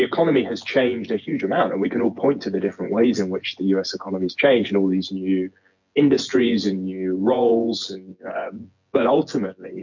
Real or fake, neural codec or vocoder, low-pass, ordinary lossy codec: fake; vocoder, 24 kHz, 100 mel bands, Vocos; 7.2 kHz; MP3, 48 kbps